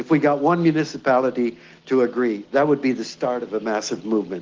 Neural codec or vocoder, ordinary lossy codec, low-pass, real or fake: none; Opus, 16 kbps; 7.2 kHz; real